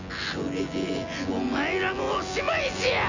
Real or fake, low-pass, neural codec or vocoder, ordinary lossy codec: fake; 7.2 kHz; vocoder, 24 kHz, 100 mel bands, Vocos; none